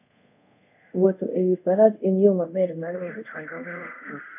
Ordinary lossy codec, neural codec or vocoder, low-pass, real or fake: none; codec, 24 kHz, 0.5 kbps, DualCodec; 3.6 kHz; fake